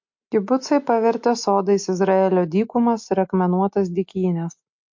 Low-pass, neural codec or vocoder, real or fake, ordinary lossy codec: 7.2 kHz; none; real; MP3, 48 kbps